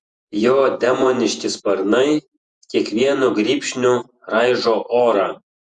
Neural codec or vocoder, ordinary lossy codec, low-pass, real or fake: vocoder, 48 kHz, 128 mel bands, Vocos; Opus, 64 kbps; 10.8 kHz; fake